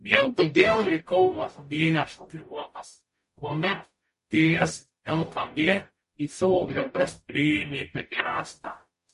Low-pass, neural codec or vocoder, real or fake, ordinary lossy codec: 14.4 kHz; codec, 44.1 kHz, 0.9 kbps, DAC; fake; MP3, 48 kbps